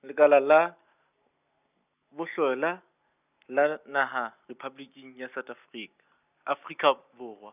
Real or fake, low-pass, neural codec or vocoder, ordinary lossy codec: real; 3.6 kHz; none; none